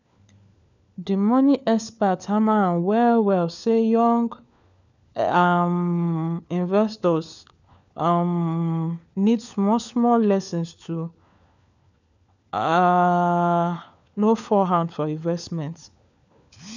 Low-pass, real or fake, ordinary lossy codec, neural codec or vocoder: 7.2 kHz; fake; none; codec, 16 kHz, 4 kbps, FunCodec, trained on LibriTTS, 50 frames a second